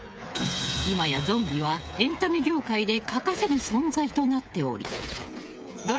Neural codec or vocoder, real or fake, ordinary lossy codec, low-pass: codec, 16 kHz, 8 kbps, FreqCodec, smaller model; fake; none; none